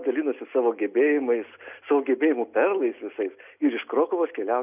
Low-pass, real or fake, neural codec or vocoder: 3.6 kHz; real; none